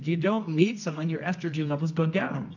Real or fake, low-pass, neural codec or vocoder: fake; 7.2 kHz; codec, 24 kHz, 0.9 kbps, WavTokenizer, medium music audio release